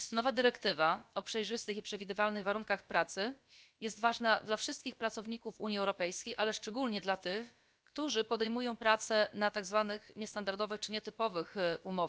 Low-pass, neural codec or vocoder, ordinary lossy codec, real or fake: none; codec, 16 kHz, about 1 kbps, DyCAST, with the encoder's durations; none; fake